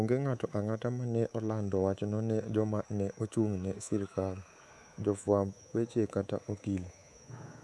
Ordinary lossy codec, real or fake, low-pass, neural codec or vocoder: none; fake; none; codec, 24 kHz, 3.1 kbps, DualCodec